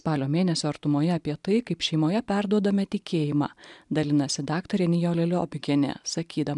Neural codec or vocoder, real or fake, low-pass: none; real; 10.8 kHz